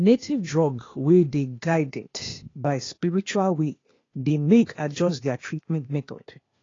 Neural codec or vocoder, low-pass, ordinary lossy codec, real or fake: codec, 16 kHz, 0.8 kbps, ZipCodec; 7.2 kHz; AAC, 32 kbps; fake